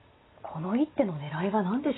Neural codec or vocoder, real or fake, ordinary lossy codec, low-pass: none; real; AAC, 16 kbps; 7.2 kHz